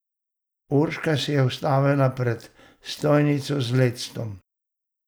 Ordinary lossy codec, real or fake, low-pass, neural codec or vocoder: none; real; none; none